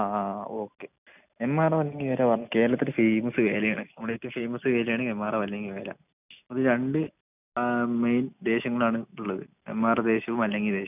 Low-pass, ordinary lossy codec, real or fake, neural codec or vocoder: 3.6 kHz; none; real; none